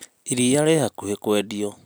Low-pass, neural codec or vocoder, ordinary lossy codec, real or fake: none; none; none; real